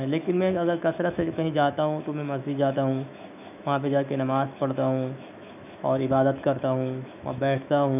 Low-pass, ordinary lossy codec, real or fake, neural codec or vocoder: 3.6 kHz; none; fake; autoencoder, 48 kHz, 128 numbers a frame, DAC-VAE, trained on Japanese speech